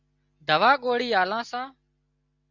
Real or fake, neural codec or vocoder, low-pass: real; none; 7.2 kHz